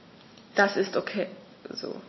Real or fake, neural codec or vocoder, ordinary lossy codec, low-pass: real; none; MP3, 24 kbps; 7.2 kHz